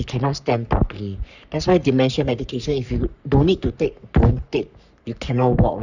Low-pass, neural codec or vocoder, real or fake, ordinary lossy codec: 7.2 kHz; codec, 44.1 kHz, 3.4 kbps, Pupu-Codec; fake; none